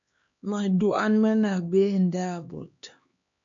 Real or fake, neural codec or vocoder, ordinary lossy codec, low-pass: fake; codec, 16 kHz, 2 kbps, X-Codec, HuBERT features, trained on LibriSpeech; AAC, 64 kbps; 7.2 kHz